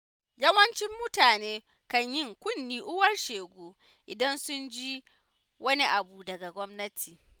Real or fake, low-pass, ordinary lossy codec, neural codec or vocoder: real; none; none; none